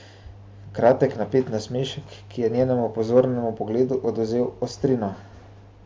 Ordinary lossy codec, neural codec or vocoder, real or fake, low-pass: none; none; real; none